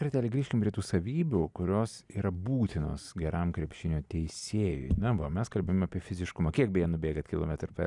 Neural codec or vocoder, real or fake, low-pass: none; real; 10.8 kHz